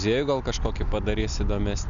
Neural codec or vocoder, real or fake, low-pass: none; real; 7.2 kHz